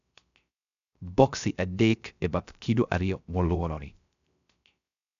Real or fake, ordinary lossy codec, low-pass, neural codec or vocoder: fake; MP3, 96 kbps; 7.2 kHz; codec, 16 kHz, 0.3 kbps, FocalCodec